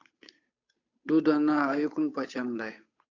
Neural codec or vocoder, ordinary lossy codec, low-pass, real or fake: codec, 16 kHz, 8 kbps, FunCodec, trained on Chinese and English, 25 frames a second; AAC, 48 kbps; 7.2 kHz; fake